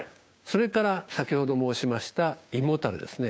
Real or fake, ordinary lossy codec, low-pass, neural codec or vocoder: fake; none; none; codec, 16 kHz, 6 kbps, DAC